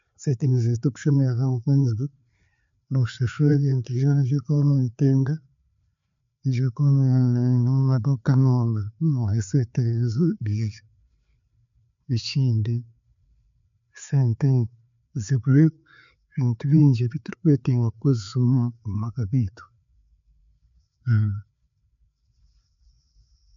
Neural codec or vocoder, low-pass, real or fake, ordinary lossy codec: codec, 16 kHz, 4 kbps, FreqCodec, larger model; 7.2 kHz; fake; MP3, 64 kbps